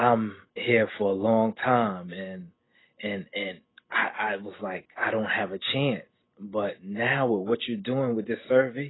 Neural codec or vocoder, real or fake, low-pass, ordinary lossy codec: none; real; 7.2 kHz; AAC, 16 kbps